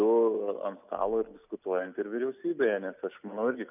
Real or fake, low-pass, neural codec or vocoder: real; 3.6 kHz; none